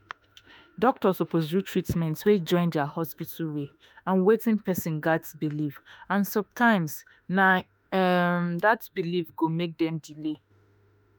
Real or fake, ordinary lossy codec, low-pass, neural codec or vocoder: fake; none; none; autoencoder, 48 kHz, 32 numbers a frame, DAC-VAE, trained on Japanese speech